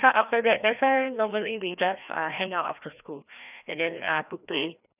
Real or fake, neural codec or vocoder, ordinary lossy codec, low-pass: fake; codec, 16 kHz, 1 kbps, FreqCodec, larger model; none; 3.6 kHz